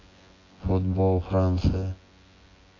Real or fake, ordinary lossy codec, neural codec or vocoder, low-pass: fake; AAC, 32 kbps; vocoder, 24 kHz, 100 mel bands, Vocos; 7.2 kHz